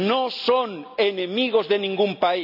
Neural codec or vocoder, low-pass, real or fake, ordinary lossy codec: none; 5.4 kHz; real; none